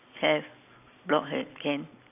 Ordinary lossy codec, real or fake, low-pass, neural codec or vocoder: none; real; 3.6 kHz; none